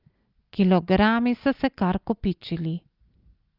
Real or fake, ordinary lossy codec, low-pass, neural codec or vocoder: real; Opus, 32 kbps; 5.4 kHz; none